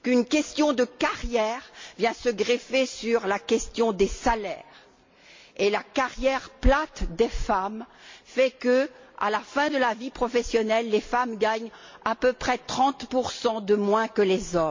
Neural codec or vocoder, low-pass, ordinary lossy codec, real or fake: none; 7.2 kHz; MP3, 48 kbps; real